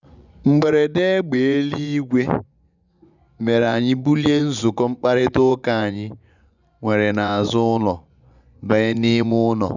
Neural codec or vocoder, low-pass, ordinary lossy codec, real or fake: vocoder, 24 kHz, 100 mel bands, Vocos; 7.2 kHz; none; fake